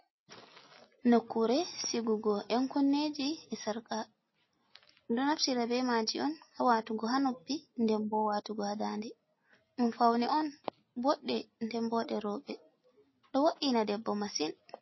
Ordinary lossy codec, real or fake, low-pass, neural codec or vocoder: MP3, 24 kbps; real; 7.2 kHz; none